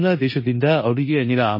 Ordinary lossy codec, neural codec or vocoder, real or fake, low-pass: MP3, 24 kbps; codec, 16 kHz in and 24 kHz out, 0.9 kbps, LongCat-Audio-Codec, four codebook decoder; fake; 5.4 kHz